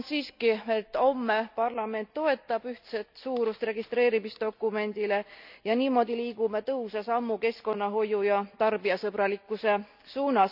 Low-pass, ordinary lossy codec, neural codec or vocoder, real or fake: 5.4 kHz; none; none; real